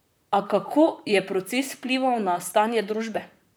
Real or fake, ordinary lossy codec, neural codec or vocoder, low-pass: fake; none; vocoder, 44.1 kHz, 128 mel bands, Pupu-Vocoder; none